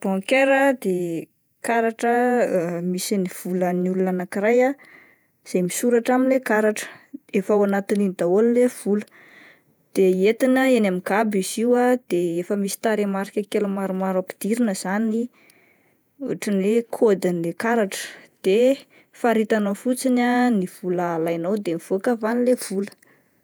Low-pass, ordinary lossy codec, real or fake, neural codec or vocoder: none; none; fake; vocoder, 48 kHz, 128 mel bands, Vocos